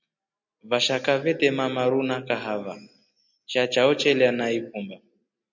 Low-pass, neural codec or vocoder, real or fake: 7.2 kHz; none; real